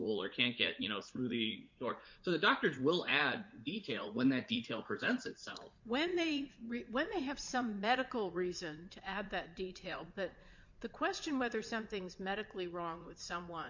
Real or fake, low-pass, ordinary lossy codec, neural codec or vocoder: fake; 7.2 kHz; MP3, 48 kbps; vocoder, 44.1 kHz, 80 mel bands, Vocos